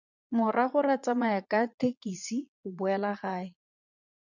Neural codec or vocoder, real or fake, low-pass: vocoder, 22.05 kHz, 80 mel bands, Vocos; fake; 7.2 kHz